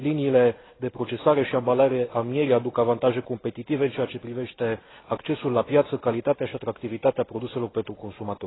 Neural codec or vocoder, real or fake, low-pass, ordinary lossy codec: none; real; 7.2 kHz; AAC, 16 kbps